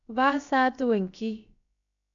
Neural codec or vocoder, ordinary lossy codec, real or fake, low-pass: codec, 16 kHz, about 1 kbps, DyCAST, with the encoder's durations; MP3, 96 kbps; fake; 7.2 kHz